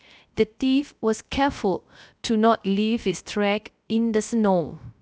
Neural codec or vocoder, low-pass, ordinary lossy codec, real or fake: codec, 16 kHz, 0.3 kbps, FocalCodec; none; none; fake